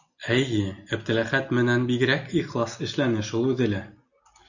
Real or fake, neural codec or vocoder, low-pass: real; none; 7.2 kHz